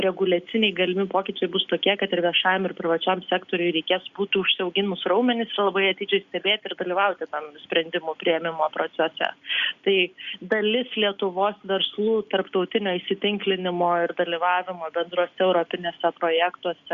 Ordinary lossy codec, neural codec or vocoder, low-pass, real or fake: Opus, 64 kbps; none; 7.2 kHz; real